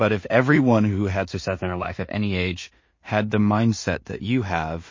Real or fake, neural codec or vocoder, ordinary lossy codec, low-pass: fake; codec, 16 kHz in and 24 kHz out, 0.4 kbps, LongCat-Audio-Codec, two codebook decoder; MP3, 32 kbps; 7.2 kHz